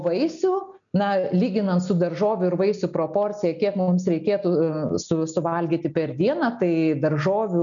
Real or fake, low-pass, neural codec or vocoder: real; 7.2 kHz; none